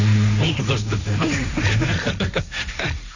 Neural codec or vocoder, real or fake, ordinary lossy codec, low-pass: codec, 16 kHz, 1.1 kbps, Voila-Tokenizer; fake; none; 7.2 kHz